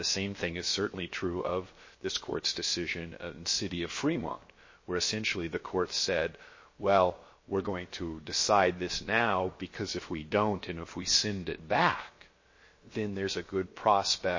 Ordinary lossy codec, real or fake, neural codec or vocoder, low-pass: MP3, 32 kbps; fake; codec, 16 kHz, about 1 kbps, DyCAST, with the encoder's durations; 7.2 kHz